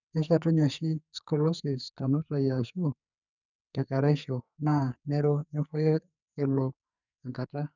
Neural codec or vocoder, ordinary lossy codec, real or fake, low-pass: codec, 44.1 kHz, 2.6 kbps, SNAC; none; fake; 7.2 kHz